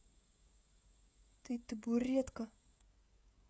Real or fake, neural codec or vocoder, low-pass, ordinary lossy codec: fake; codec, 16 kHz, 16 kbps, FreqCodec, smaller model; none; none